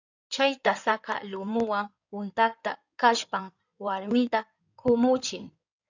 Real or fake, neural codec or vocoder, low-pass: fake; codec, 16 kHz in and 24 kHz out, 2.2 kbps, FireRedTTS-2 codec; 7.2 kHz